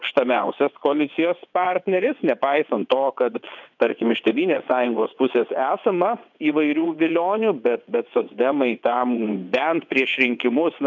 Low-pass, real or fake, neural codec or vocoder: 7.2 kHz; fake; vocoder, 22.05 kHz, 80 mel bands, WaveNeXt